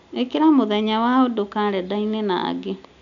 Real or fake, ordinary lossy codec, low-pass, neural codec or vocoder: real; none; 7.2 kHz; none